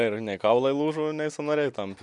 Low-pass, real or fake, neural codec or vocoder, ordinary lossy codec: 10.8 kHz; real; none; MP3, 96 kbps